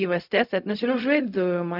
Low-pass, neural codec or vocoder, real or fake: 5.4 kHz; codec, 16 kHz, 0.4 kbps, LongCat-Audio-Codec; fake